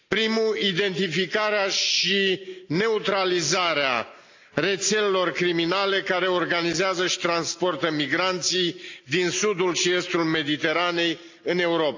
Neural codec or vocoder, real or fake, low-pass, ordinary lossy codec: none; real; 7.2 kHz; AAC, 48 kbps